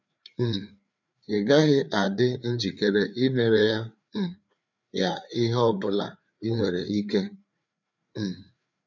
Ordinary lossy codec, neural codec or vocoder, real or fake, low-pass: none; codec, 16 kHz, 4 kbps, FreqCodec, larger model; fake; 7.2 kHz